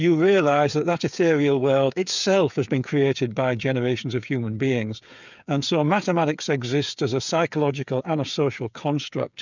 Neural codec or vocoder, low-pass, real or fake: codec, 16 kHz, 8 kbps, FreqCodec, smaller model; 7.2 kHz; fake